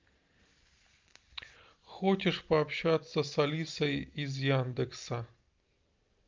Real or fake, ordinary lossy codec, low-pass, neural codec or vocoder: real; Opus, 24 kbps; 7.2 kHz; none